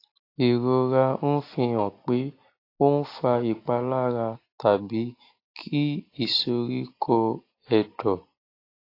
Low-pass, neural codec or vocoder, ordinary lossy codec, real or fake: 5.4 kHz; none; AAC, 32 kbps; real